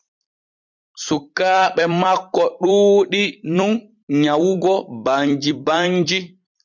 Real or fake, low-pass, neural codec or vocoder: fake; 7.2 kHz; vocoder, 44.1 kHz, 128 mel bands every 256 samples, BigVGAN v2